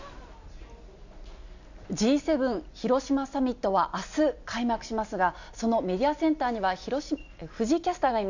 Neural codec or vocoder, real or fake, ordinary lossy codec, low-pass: none; real; none; 7.2 kHz